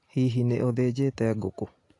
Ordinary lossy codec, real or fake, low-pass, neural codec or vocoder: AAC, 48 kbps; real; 10.8 kHz; none